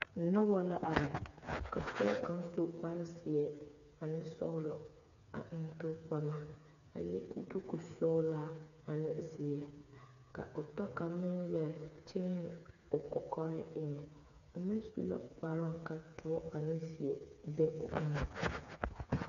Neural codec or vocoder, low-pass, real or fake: codec, 16 kHz, 4 kbps, FreqCodec, smaller model; 7.2 kHz; fake